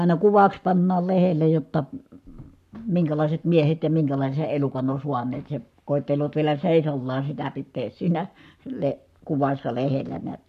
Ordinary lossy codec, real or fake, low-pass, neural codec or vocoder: none; fake; 14.4 kHz; codec, 44.1 kHz, 7.8 kbps, Pupu-Codec